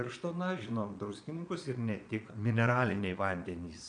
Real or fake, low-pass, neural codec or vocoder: fake; 9.9 kHz; vocoder, 22.05 kHz, 80 mel bands, Vocos